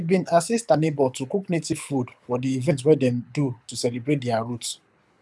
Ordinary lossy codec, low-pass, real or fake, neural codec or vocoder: none; none; fake; codec, 24 kHz, 6 kbps, HILCodec